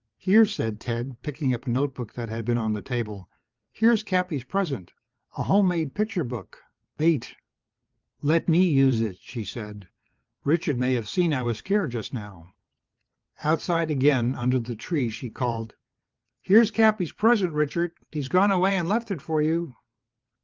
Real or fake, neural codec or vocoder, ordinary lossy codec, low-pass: fake; vocoder, 22.05 kHz, 80 mel bands, WaveNeXt; Opus, 32 kbps; 7.2 kHz